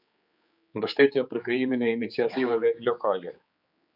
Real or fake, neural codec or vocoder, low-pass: fake; codec, 16 kHz, 4 kbps, X-Codec, HuBERT features, trained on general audio; 5.4 kHz